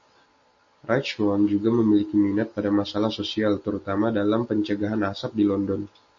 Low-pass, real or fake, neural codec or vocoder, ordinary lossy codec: 7.2 kHz; real; none; MP3, 32 kbps